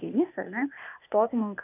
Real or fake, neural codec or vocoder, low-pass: fake; codec, 16 kHz, 0.8 kbps, ZipCodec; 3.6 kHz